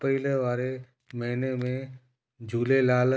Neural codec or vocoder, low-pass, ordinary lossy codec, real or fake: none; none; none; real